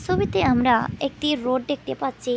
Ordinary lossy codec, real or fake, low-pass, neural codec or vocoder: none; real; none; none